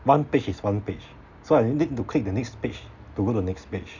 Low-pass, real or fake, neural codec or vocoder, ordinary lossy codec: 7.2 kHz; real; none; none